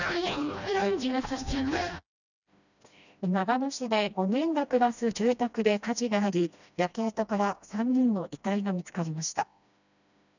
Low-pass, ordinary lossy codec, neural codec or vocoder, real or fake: 7.2 kHz; none; codec, 16 kHz, 1 kbps, FreqCodec, smaller model; fake